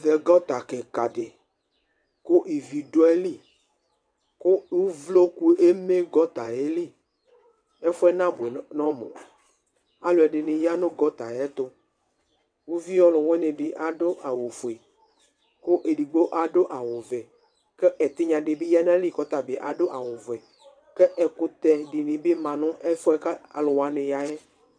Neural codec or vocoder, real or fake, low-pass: vocoder, 22.05 kHz, 80 mel bands, WaveNeXt; fake; 9.9 kHz